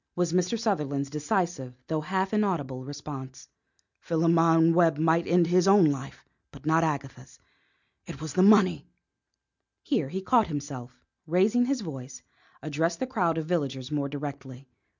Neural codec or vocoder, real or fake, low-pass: none; real; 7.2 kHz